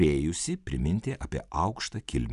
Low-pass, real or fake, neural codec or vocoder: 10.8 kHz; real; none